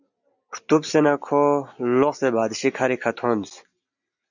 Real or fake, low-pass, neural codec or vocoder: real; 7.2 kHz; none